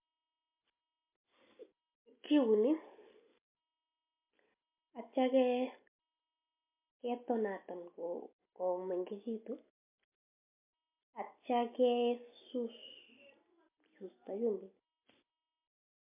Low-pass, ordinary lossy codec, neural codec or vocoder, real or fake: 3.6 kHz; MP3, 32 kbps; none; real